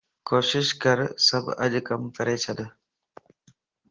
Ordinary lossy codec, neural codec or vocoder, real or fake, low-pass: Opus, 16 kbps; none; real; 7.2 kHz